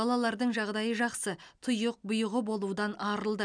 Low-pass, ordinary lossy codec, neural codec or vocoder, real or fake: 9.9 kHz; none; none; real